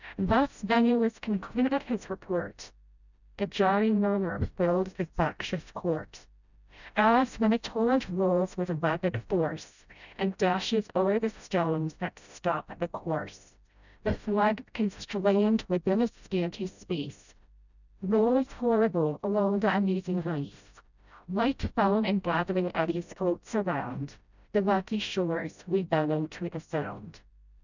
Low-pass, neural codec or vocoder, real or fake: 7.2 kHz; codec, 16 kHz, 0.5 kbps, FreqCodec, smaller model; fake